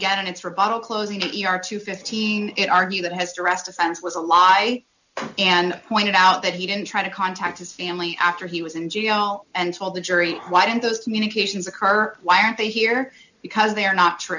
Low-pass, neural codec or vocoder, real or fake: 7.2 kHz; none; real